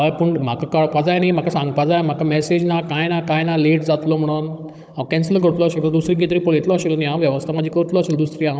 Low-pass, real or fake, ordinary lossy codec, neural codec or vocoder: none; fake; none; codec, 16 kHz, 16 kbps, FunCodec, trained on Chinese and English, 50 frames a second